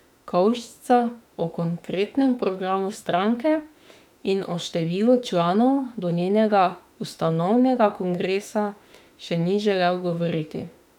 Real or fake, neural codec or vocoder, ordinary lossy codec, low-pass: fake; autoencoder, 48 kHz, 32 numbers a frame, DAC-VAE, trained on Japanese speech; none; 19.8 kHz